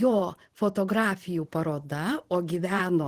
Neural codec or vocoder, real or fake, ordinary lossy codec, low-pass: vocoder, 44.1 kHz, 128 mel bands every 512 samples, BigVGAN v2; fake; Opus, 16 kbps; 14.4 kHz